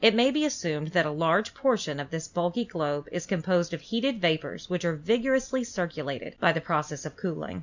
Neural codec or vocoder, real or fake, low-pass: none; real; 7.2 kHz